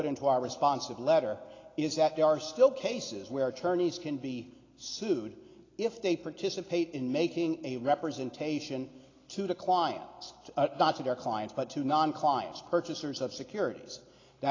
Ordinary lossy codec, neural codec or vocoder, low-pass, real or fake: AAC, 32 kbps; none; 7.2 kHz; real